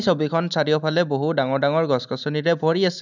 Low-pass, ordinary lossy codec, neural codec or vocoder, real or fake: 7.2 kHz; none; none; real